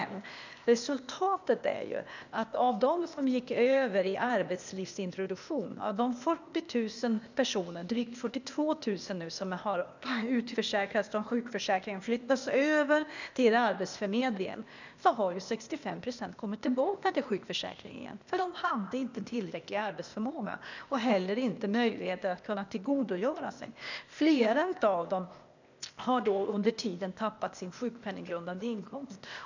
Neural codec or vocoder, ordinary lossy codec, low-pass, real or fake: codec, 16 kHz, 0.8 kbps, ZipCodec; none; 7.2 kHz; fake